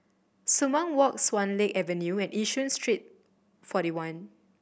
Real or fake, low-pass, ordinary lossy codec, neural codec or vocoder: real; none; none; none